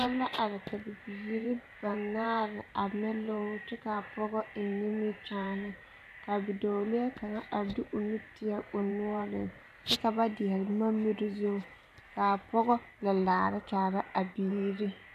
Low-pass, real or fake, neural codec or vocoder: 14.4 kHz; fake; vocoder, 44.1 kHz, 128 mel bands every 512 samples, BigVGAN v2